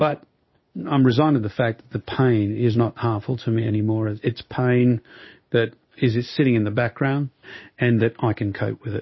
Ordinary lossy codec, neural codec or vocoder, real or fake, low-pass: MP3, 24 kbps; codec, 16 kHz in and 24 kHz out, 1 kbps, XY-Tokenizer; fake; 7.2 kHz